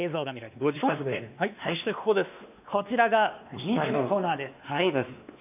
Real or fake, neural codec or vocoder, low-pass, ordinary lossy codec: fake; codec, 16 kHz, 2 kbps, X-Codec, WavLM features, trained on Multilingual LibriSpeech; 3.6 kHz; none